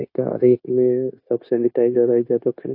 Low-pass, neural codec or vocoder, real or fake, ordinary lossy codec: 5.4 kHz; codec, 16 kHz, 0.9 kbps, LongCat-Audio-Codec; fake; AAC, 32 kbps